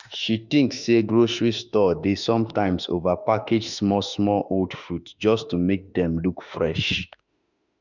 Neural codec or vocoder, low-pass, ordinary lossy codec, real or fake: autoencoder, 48 kHz, 32 numbers a frame, DAC-VAE, trained on Japanese speech; 7.2 kHz; none; fake